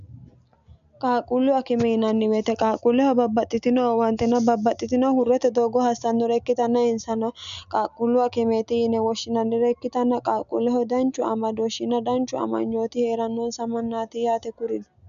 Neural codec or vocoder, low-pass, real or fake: none; 7.2 kHz; real